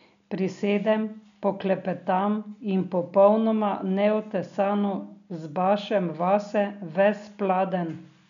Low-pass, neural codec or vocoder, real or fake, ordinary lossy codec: 7.2 kHz; none; real; none